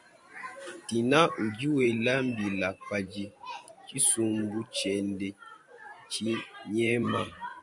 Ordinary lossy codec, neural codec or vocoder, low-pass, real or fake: MP3, 96 kbps; vocoder, 44.1 kHz, 128 mel bands every 512 samples, BigVGAN v2; 10.8 kHz; fake